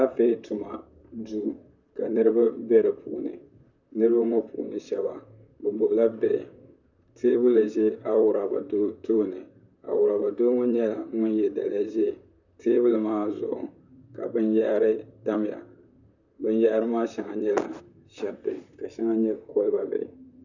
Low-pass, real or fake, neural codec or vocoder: 7.2 kHz; fake; vocoder, 44.1 kHz, 128 mel bands, Pupu-Vocoder